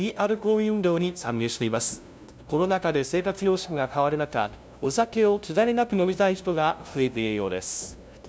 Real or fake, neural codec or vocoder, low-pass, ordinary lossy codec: fake; codec, 16 kHz, 0.5 kbps, FunCodec, trained on LibriTTS, 25 frames a second; none; none